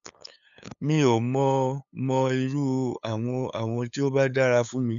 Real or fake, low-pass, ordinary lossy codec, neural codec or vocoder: fake; 7.2 kHz; none; codec, 16 kHz, 8 kbps, FunCodec, trained on LibriTTS, 25 frames a second